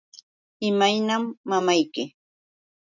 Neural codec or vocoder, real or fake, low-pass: none; real; 7.2 kHz